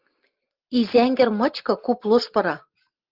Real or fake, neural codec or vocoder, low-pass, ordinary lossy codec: real; none; 5.4 kHz; Opus, 24 kbps